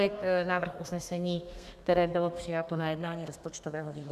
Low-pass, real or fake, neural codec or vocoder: 14.4 kHz; fake; codec, 32 kHz, 1.9 kbps, SNAC